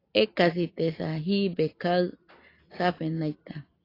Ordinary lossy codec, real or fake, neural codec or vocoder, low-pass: AAC, 24 kbps; real; none; 5.4 kHz